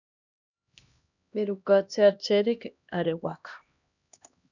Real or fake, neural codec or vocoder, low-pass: fake; codec, 16 kHz, 1 kbps, X-Codec, HuBERT features, trained on LibriSpeech; 7.2 kHz